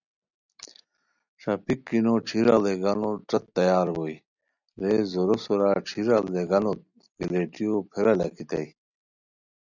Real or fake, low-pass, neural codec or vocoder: real; 7.2 kHz; none